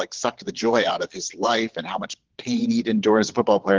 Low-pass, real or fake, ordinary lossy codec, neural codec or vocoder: 7.2 kHz; fake; Opus, 16 kbps; vocoder, 22.05 kHz, 80 mel bands, Vocos